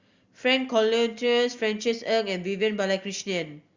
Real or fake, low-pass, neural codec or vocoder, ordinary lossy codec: real; 7.2 kHz; none; Opus, 64 kbps